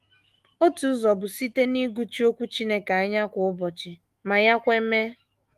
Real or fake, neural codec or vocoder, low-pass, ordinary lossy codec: fake; autoencoder, 48 kHz, 128 numbers a frame, DAC-VAE, trained on Japanese speech; 14.4 kHz; Opus, 24 kbps